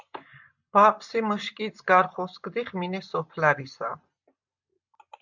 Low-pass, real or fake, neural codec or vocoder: 7.2 kHz; real; none